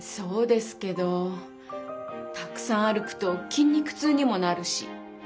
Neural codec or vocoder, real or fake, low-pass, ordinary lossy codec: none; real; none; none